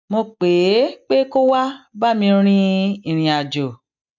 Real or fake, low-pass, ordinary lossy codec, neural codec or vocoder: real; 7.2 kHz; none; none